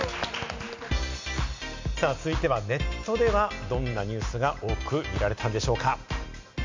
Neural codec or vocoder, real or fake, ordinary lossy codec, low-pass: none; real; MP3, 64 kbps; 7.2 kHz